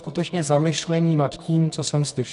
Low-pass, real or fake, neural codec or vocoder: 10.8 kHz; fake; codec, 24 kHz, 0.9 kbps, WavTokenizer, medium music audio release